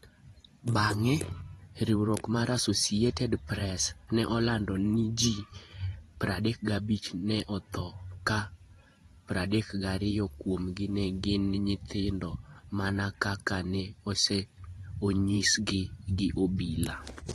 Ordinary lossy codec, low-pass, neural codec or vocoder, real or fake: AAC, 32 kbps; 19.8 kHz; vocoder, 44.1 kHz, 128 mel bands every 512 samples, BigVGAN v2; fake